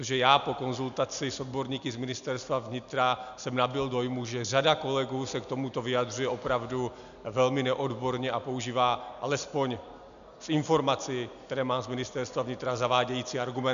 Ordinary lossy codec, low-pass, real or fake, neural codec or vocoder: MP3, 96 kbps; 7.2 kHz; real; none